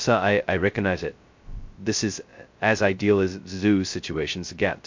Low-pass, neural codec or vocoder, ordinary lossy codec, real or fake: 7.2 kHz; codec, 16 kHz, 0.2 kbps, FocalCodec; MP3, 48 kbps; fake